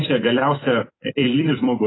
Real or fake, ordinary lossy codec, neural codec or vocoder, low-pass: fake; AAC, 16 kbps; vocoder, 44.1 kHz, 128 mel bands every 256 samples, BigVGAN v2; 7.2 kHz